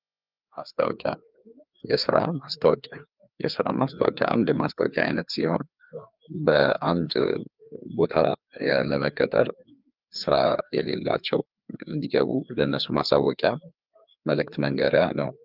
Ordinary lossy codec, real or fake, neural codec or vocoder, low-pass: Opus, 24 kbps; fake; codec, 16 kHz, 2 kbps, FreqCodec, larger model; 5.4 kHz